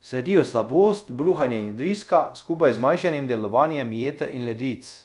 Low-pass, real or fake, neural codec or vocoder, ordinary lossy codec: 10.8 kHz; fake; codec, 24 kHz, 0.5 kbps, DualCodec; none